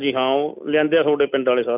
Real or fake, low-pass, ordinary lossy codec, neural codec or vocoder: real; 3.6 kHz; none; none